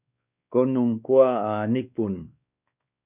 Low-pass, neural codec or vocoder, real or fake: 3.6 kHz; codec, 16 kHz, 2 kbps, X-Codec, WavLM features, trained on Multilingual LibriSpeech; fake